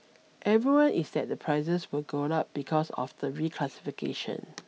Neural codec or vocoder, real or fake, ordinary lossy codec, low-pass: none; real; none; none